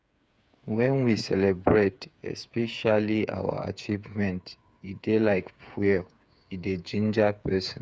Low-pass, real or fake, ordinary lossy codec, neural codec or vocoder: none; fake; none; codec, 16 kHz, 8 kbps, FreqCodec, smaller model